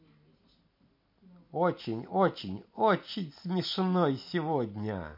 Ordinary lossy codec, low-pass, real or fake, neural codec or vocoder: MP3, 32 kbps; 5.4 kHz; real; none